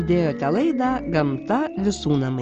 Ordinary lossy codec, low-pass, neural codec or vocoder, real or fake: Opus, 24 kbps; 7.2 kHz; none; real